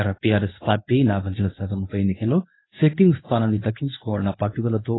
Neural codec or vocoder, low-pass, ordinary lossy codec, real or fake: codec, 24 kHz, 0.9 kbps, WavTokenizer, medium speech release version 1; 7.2 kHz; AAC, 16 kbps; fake